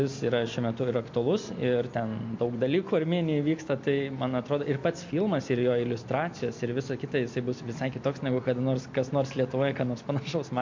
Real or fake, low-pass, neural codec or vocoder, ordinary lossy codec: real; 7.2 kHz; none; MP3, 48 kbps